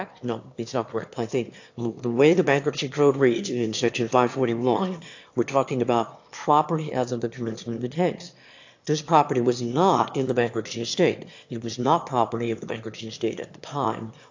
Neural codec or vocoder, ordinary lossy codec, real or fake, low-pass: autoencoder, 22.05 kHz, a latent of 192 numbers a frame, VITS, trained on one speaker; AAC, 48 kbps; fake; 7.2 kHz